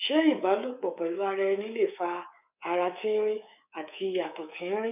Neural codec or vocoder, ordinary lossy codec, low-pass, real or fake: codec, 24 kHz, 3.1 kbps, DualCodec; none; 3.6 kHz; fake